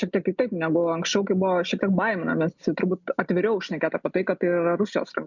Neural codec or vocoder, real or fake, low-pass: none; real; 7.2 kHz